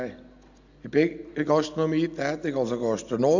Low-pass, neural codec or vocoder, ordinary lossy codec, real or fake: 7.2 kHz; none; none; real